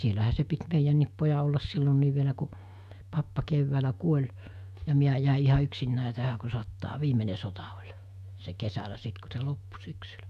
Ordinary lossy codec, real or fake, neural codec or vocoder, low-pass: none; fake; autoencoder, 48 kHz, 128 numbers a frame, DAC-VAE, trained on Japanese speech; 14.4 kHz